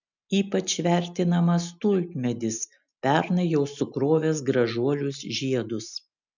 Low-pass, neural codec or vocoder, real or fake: 7.2 kHz; none; real